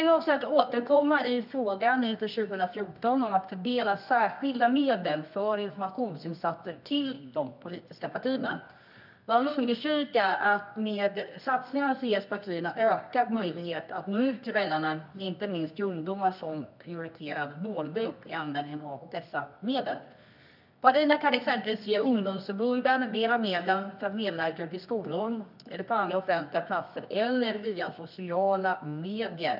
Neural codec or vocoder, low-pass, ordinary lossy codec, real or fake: codec, 24 kHz, 0.9 kbps, WavTokenizer, medium music audio release; 5.4 kHz; none; fake